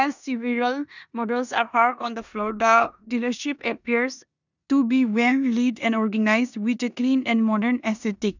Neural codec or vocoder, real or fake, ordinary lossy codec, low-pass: codec, 16 kHz in and 24 kHz out, 0.9 kbps, LongCat-Audio-Codec, four codebook decoder; fake; none; 7.2 kHz